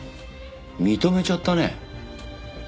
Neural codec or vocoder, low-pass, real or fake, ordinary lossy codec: none; none; real; none